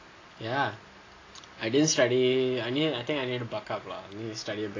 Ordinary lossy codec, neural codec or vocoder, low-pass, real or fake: AAC, 32 kbps; none; 7.2 kHz; real